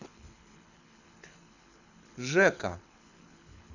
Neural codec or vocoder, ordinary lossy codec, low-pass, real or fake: codec, 24 kHz, 6 kbps, HILCodec; none; 7.2 kHz; fake